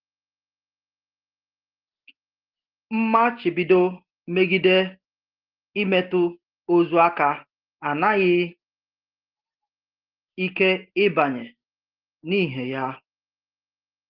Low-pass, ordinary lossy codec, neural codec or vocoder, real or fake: 5.4 kHz; Opus, 16 kbps; none; real